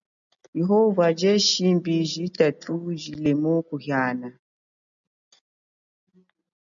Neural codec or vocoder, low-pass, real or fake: none; 7.2 kHz; real